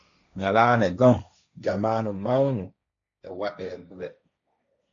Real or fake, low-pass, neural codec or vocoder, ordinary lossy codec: fake; 7.2 kHz; codec, 16 kHz, 1.1 kbps, Voila-Tokenizer; AAC, 64 kbps